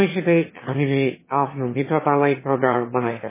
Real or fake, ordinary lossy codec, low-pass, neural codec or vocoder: fake; MP3, 16 kbps; 3.6 kHz; autoencoder, 22.05 kHz, a latent of 192 numbers a frame, VITS, trained on one speaker